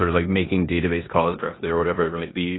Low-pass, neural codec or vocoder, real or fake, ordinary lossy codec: 7.2 kHz; codec, 16 kHz in and 24 kHz out, 0.9 kbps, LongCat-Audio-Codec, four codebook decoder; fake; AAC, 16 kbps